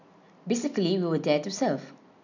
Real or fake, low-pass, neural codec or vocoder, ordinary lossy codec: real; 7.2 kHz; none; none